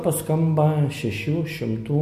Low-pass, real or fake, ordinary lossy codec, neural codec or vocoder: 14.4 kHz; real; MP3, 64 kbps; none